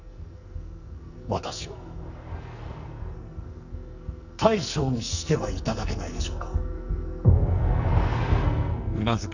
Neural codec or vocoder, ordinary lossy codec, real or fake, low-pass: codec, 44.1 kHz, 2.6 kbps, SNAC; none; fake; 7.2 kHz